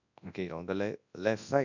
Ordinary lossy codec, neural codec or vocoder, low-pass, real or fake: none; codec, 24 kHz, 0.9 kbps, WavTokenizer, large speech release; 7.2 kHz; fake